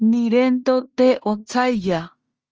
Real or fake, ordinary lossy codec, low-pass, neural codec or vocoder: fake; Opus, 24 kbps; 7.2 kHz; codec, 16 kHz in and 24 kHz out, 0.9 kbps, LongCat-Audio-Codec, fine tuned four codebook decoder